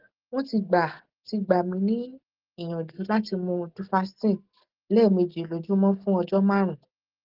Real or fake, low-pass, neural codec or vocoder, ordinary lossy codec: real; 5.4 kHz; none; Opus, 16 kbps